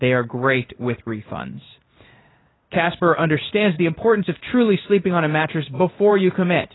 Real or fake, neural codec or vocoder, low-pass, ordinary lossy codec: fake; codec, 16 kHz in and 24 kHz out, 1 kbps, XY-Tokenizer; 7.2 kHz; AAC, 16 kbps